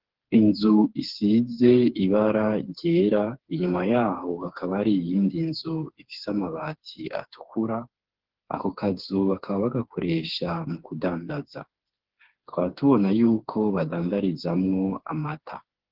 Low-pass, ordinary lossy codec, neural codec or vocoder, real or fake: 5.4 kHz; Opus, 16 kbps; codec, 16 kHz, 4 kbps, FreqCodec, smaller model; fake